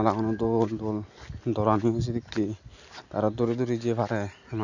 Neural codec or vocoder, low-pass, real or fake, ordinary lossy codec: none; 7.2 kHz; real; none